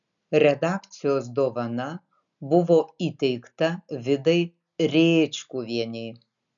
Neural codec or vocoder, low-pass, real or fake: none; 7.2 kHz; real